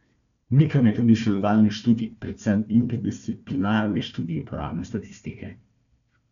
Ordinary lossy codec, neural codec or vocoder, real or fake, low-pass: none; codec, 16 kHz, 1 kbps, FunCodec, trained on Chinese and English, 50 frames a second; fake; 7.2 kHz